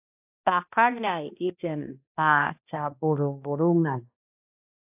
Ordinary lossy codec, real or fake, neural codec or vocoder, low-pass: AAC, 32 kbps; fake; codec, 16 kHz, 1 kbps, X-Codec, HuBERT features, trained on balanced general audio; 3.6 kHz